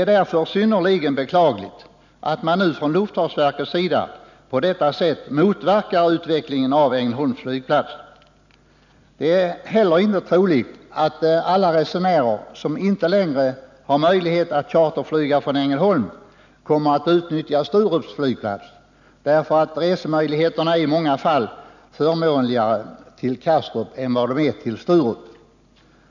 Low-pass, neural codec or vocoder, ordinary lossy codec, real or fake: 7.2 kHz; none; none; real